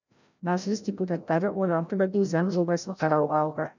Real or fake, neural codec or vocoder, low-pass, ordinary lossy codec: fake; codec, 16 kHz, 0.5 kbps, FreqCodec, larger model; 7.2 kHz; none